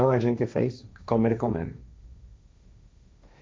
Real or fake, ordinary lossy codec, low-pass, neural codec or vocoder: fake; none; none; codec, 16 kHz, 1.1 kbps, Voila-Tokenizer